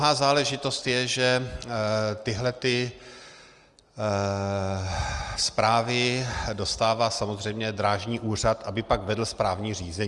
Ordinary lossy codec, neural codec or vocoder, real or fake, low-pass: Opus, 32 kbps; none; real; 10.8 kHz